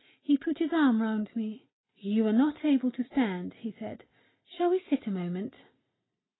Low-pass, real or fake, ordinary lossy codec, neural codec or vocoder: 7.2 kHz; real; AAC, 16 kbps; none